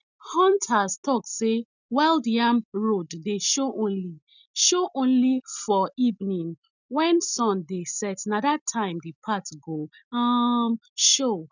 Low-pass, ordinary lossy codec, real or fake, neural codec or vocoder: 7.2 kHz; none; real; none